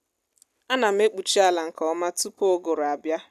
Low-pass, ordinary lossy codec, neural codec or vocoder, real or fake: 14.4 kHz; none; none; real